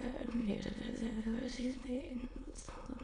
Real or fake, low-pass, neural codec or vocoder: fake; 9.9 kHz; autoencoder, 22.05 kHz, a latent of 192 numbers a frame, VITS, trained on many speakers